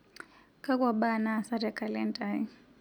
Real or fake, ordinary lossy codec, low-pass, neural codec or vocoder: real; none; 19.8 kHz; none